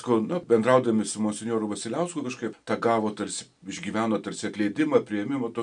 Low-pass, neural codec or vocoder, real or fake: 9.9 kHz; none; real